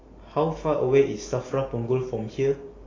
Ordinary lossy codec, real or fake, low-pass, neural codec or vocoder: AAC, 32 kbps; real; 7.2 kHz; none